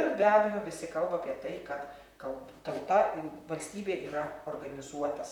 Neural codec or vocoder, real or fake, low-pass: vocoder, 44.1 kHz, 128 mel bands, Pupu-Vocoder; fake; 19.8 kHz